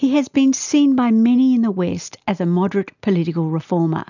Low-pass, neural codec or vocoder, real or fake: 7.2 kHz; none; real